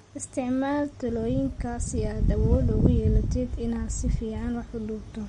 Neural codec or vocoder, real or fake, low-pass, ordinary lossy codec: none; real; 19.8 kHz; MP3, 48 kbps